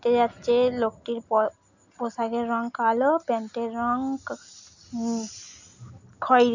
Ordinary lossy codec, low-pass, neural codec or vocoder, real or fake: none; 7.2 kHz; none; real